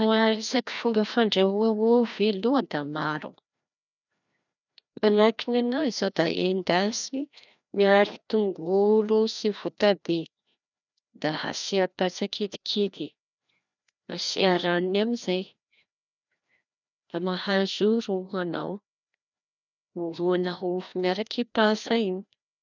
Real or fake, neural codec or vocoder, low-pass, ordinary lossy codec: fake; codec, 16 kHz, 1 kbps, FreqCodec, larger model; 7.2 kHz; none